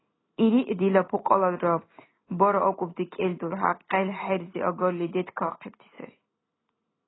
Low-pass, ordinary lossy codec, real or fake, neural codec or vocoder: 7.2 kHz; AAC, 16 kbps; real; none